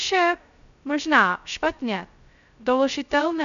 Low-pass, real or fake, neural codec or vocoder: 7.2 kHz; fake; codec, 16 kHz, 0.2 kbps, FocalCodec